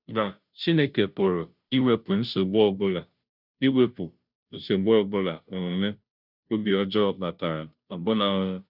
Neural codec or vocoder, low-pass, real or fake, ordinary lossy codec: codec, 16 kHz, 0.5 kbps, FunCodec, trained on Chinese and English, 25 frames a second; 5.4 kHz; fake; none